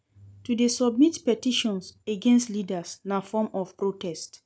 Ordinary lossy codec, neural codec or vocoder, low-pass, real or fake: none; none; none; real